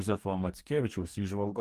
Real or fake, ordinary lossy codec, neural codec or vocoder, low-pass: fake; Opus, 32 kbps; codec, 44.1 kHz, 2.6 kbps, DAC; 14.4 kHz